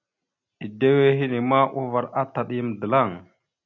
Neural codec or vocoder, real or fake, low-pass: none; real; 7.2 kHz